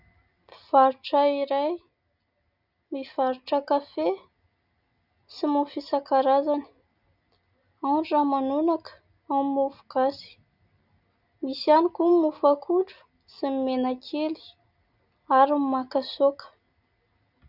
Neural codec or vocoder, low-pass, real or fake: none; 5.4 kHz; real